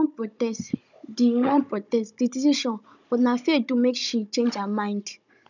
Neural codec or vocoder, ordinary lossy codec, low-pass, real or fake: codec, 16 kHz, 16 kbps, FunCodec, trained on Chinese and English, 50 frames a second; none; 7.2 kHz; fake